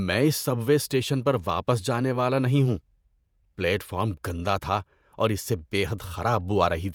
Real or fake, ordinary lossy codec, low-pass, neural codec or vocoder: fake; none; none; vocoder, 48 kHz, 128 mel bands, Vocos